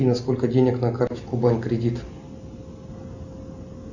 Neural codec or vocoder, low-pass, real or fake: none; 7.2 kHz; real